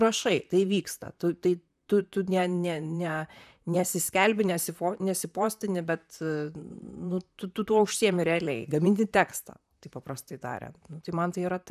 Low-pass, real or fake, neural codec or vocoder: 14.4 kHz; fake; vocoder, 44.1 kHz, 128 mel bands, Pupu-Vocoder